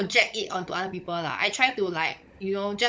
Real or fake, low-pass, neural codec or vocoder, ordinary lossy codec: fake; none; codec, 16 kHz, 16 kbps, FunCodec, trained on LibriTTS, 50 frames a second; none